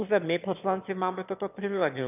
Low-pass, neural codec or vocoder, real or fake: 3.6 kHz; autoencoder, 22.05 kHz, a latent of 192 numbers a frame, VITS, trained on one speaker; fake